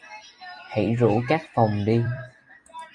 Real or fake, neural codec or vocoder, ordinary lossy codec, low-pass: fake; vocoder, 44.1 kHz, 128 mel bands every 256 samples, BigVGAN v2; Opus, 64 kbps; 10.8 kHz